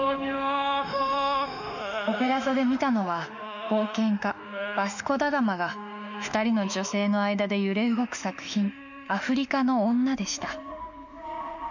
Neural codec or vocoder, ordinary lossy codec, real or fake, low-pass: autoencoder, 48 kHz, 32 numbers a frame, DAC-VAE, trained on Japanese speech; none; fake; 7.2 kHz